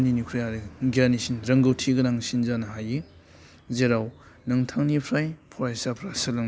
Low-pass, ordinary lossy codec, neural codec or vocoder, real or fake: none; none; none; real